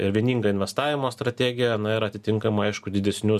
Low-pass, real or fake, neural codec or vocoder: 14.4 kHz; real; none